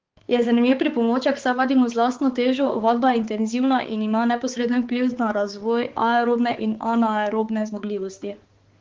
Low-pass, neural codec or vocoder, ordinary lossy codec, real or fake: 7.2 kHz; codec, 16 kHz, 4 kbps, X-Codec, HuBERT features, trained on balanced general audio; Opus, 16 kbps; fake